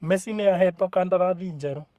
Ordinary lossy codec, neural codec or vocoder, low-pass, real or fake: Opus, 64 kbps; codec, 44.1 kHz, 3.4 kbps, Pupu-Codec; 14.4 kHz; fake